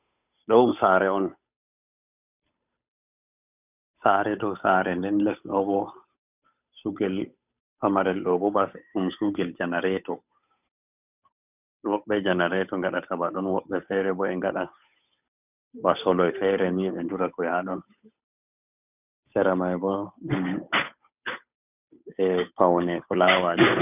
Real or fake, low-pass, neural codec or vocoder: fake; 3.6 kHz; codec, 16 kHz, 8 kbps, FunCodec, trained on Chinese and English, 25 frames a second